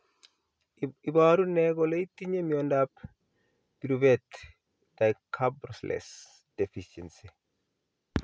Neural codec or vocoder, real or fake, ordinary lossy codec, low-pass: none; real; none; none